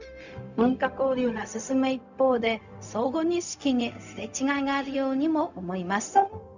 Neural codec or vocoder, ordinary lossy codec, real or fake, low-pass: codec, 16 kHz, 0.4 kbps, LongCat-Audio-Codec; none; fake; 7.2 kHz